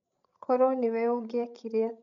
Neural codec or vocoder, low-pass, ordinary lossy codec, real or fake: codec, 16 kHz, 16 kbps, FreqCodec, larger model; 7.2 kHz; none; fake